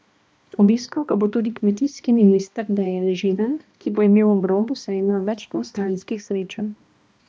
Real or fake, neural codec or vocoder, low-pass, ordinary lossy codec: fake; codec, 16 kHz, 1 kbps, X-Codec, HuBERT features, trained on balanced general audio; none; none